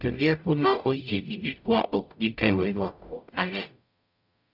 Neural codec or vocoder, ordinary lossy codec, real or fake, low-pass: codec, 44.1 kHz, 0.9 kbps, DAC; none; fake; 5.4 kHz